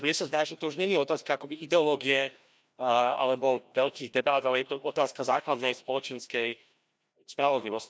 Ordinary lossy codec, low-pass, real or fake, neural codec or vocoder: none; none; fake; codec, 16 kHz, 1 kbps, FreqCodec, larger model